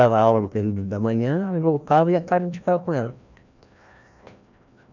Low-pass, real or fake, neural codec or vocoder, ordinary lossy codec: 7.2 kHz; fake; codec, 16 kHz, 1 kbps, FreqCodec, larger model; none